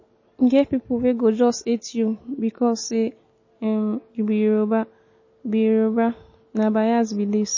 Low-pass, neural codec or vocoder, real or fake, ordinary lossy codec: 7.2 kHz; none; real; MP3, 32 kbps